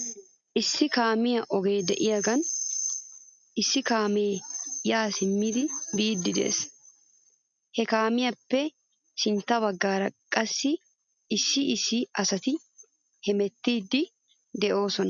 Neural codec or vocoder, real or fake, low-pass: none; real; 7.2 kHz